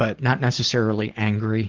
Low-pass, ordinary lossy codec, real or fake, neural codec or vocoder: 7.2 kHz; Opus, 16 kbps; real; none